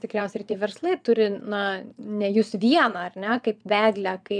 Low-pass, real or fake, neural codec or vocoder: 9.9 kHz; fake; vocoder, 22.05 kHz, 80 mel bands, Vocos